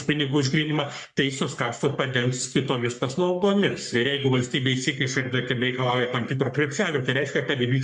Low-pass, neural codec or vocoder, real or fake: 10.8 kHz; codec, 44.1 kHz, 3.4 kbps, Pupu-Codec; fake